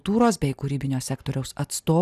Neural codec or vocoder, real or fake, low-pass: none; real; 14.4 kHz